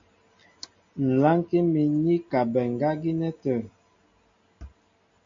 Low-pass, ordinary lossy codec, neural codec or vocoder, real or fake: 7.2 kHz; MP3, 48 kbps; none; real